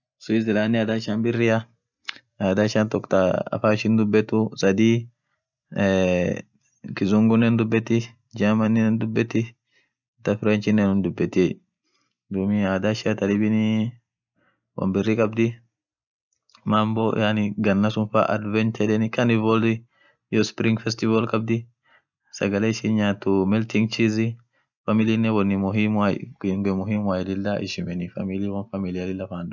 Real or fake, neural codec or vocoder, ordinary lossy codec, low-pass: real; none; none; 7.2 kHz